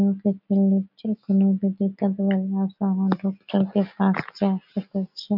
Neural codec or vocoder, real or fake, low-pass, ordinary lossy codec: none; real; 5.4 kHz; none